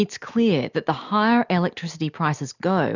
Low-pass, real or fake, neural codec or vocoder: 7.2 kHz; real; none